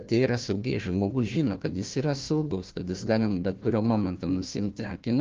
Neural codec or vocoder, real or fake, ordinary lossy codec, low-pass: codec, 16 kHz, 1 kbps, FunCodec, trained on Chinese and English, 50 frames a second; fake; Opus, 24 kbps; 7.2 kHz